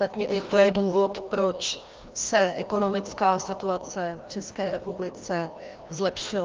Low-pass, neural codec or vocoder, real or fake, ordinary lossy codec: 7.2 kHz; codec, 16 kHz, 1 kbps, FreqCodec, larger model; fake; Opus, 24 kbps